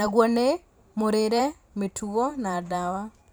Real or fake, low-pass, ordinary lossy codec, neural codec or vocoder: fake; none; none; vocoder, 44.1 kHz, 128 mel bands every 512 samples, BigVGAN v2